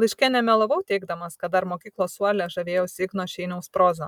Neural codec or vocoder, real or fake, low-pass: none; real; 19.8 kHz